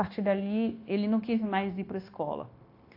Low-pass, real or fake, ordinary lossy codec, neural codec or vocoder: 5.4 kHz; fake; AAC, 32 kbps; codec, 16 kHz, 0.9 kbps, LongCat-Audio-Codec